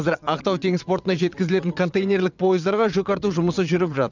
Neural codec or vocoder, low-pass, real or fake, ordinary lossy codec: vocoder, 22.05 kHz, 80 mel bands, WaveNeXt; 7.2 kHz; fake; none